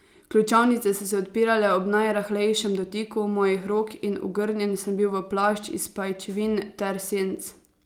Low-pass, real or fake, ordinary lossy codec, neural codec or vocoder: 19.8 kHz; real; Opus, 32 kbps; none